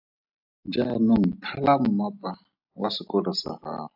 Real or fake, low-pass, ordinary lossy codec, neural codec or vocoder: real; 5.4 kHz; AAC, 48 kbps; none